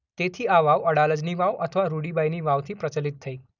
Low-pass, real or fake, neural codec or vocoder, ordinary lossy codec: 7.2 kHz; real; none; none